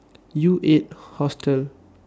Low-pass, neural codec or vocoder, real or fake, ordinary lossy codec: none; none; real; none